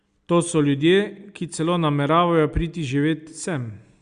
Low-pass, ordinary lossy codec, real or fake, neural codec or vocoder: 9.9 kHz; Opus, 64 kbps; real; none